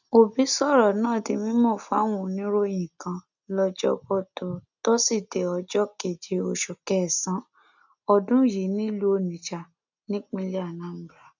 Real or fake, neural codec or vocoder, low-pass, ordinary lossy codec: real; none; 7.2 kHz; none